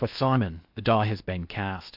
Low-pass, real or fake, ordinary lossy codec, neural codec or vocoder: 5.4 kHz; fake; AAC, 48 kbps; codec, 16 kHz in and 24 kHz out, 0.6 kbps, FocalCodec, streaming, 4096 codes